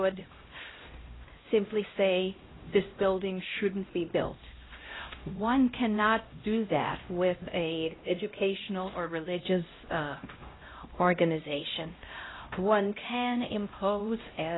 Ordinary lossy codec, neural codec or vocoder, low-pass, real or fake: AAC, 16 kbps; codec, 16 kHz, 0.5 kbps, X-Codec, HuBERT features, trained on LibriSpeech; 7.2 kHz; fake